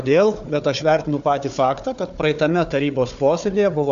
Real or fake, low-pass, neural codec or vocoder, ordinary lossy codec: fake; 7.2 kHz; codec, 16 kHz, 4 kbps, FunCodec, trained on Chinese and English, 50 frames a second; AAC, 96 kbps